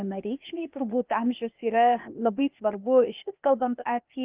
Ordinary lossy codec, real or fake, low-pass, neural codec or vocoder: Opus, 24 kbps; fake; 3.6 kHz; codec, 16 kHz, 0.8 kbps, ZipCodec